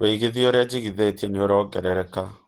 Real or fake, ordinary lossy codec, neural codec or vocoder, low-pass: fake; Opus, 16 kbps; vocoder, 48 kHz, 128 mel bands, Vocos; 19.8 kHz